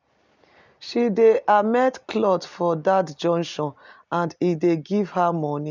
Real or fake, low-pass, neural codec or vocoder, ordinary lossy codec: real; 7.2 kHz; none; none